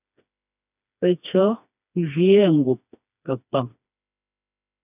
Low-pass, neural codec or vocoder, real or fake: 3.6 kHz; codec, 16 kHz, 2 kbps, FreqCodec, smaller model; fake